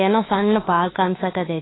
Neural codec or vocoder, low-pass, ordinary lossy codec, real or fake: autoencoder, 48 kHz, 32 numbers a frame, DAC-VAE, trained on Japanese speech; 7.2 kHz; AAC, 16 kbps; fake